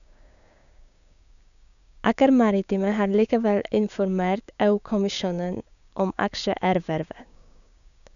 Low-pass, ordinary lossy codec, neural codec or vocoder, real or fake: 7.2 kHz; none; codec, 16 kHz, 6 kbps, DAC; fake